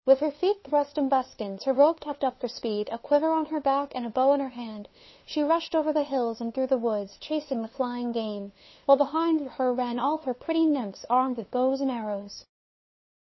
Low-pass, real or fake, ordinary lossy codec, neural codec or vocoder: 7.2 kHz; fake; MP3, 24 kbps; codec, 16 kHz, 2 kbps, FunCodec, trained on LibriTTS, 25 frames a second